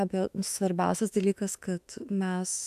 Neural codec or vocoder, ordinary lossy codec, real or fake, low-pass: autoencoder, 48 kHz, 32 numbers a frame, DAC-VAE, trained on Japanese speech; AAC, 96 kbps; fake; 14.4 kHz